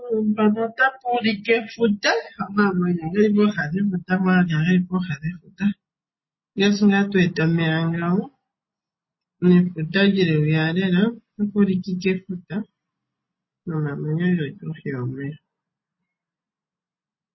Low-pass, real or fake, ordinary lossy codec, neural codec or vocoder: 7.2 kHz; real; MP3, 24 kbps; none